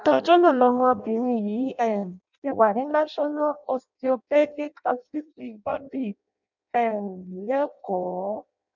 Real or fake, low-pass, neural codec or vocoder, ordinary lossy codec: fake; 7.2 kHz; codec, 16 kHz in and 24 kHz out, 0.6 kbps, FireRedTTS-2 codec; none